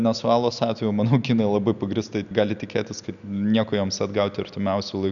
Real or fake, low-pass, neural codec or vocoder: real; 7.2 kHz; none